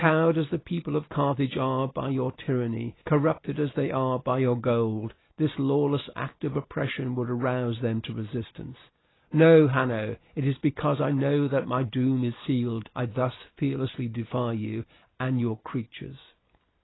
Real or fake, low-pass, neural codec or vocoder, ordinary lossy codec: real; 7.2 kHz; none; AAC, 16 kbps